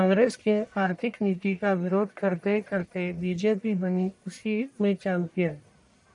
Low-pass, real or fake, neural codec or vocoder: 10.8 kHz; fake; codec, 44.1 kHz, 1.7 kbps, Pupu-Codec